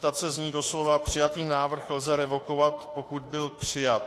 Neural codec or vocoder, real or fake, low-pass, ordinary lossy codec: autoencoder, 48 kHz, 32 numbers a frame, DAC-VAE, trained on Japanese speech; fake; 14.4 kHz; AAC, 48 kbps